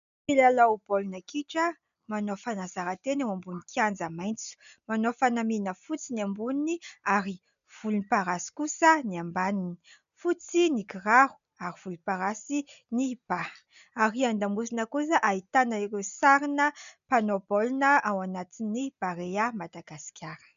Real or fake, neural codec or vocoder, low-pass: real; none; 7.2 kHz